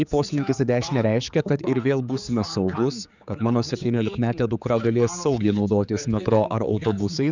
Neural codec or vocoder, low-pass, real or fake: codec, 16 kHz, 4 kbps, X-Codec, HuBERT features, trained on general audio; 7.2 kHz; fake